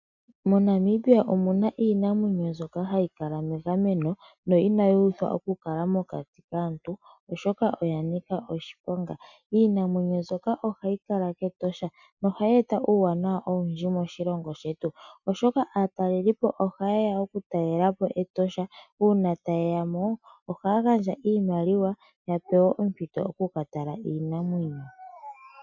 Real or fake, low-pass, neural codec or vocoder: real; 7.2 kHz; none